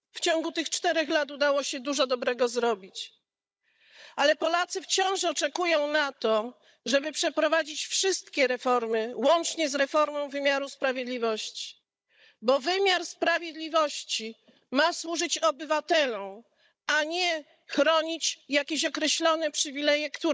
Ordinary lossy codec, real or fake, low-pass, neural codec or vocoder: none; fake; none; codec, 16 kHz, 16 kbps, FunCodec, trained on Chinese and English, 50 frames a second